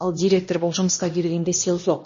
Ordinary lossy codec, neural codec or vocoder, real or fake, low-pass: MP3, 32 kbps; codec, 16 kHz, 1 kbps, X-Codec, HuBERT features, trained on LibriSpeech; fake; 7.2 kHz